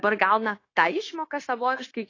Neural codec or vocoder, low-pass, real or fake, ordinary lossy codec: codec, 16 kHz, 0.9 kbps, LongCat-Audio-Codec; 7.2 kHz; fake; AAC, 32 kbps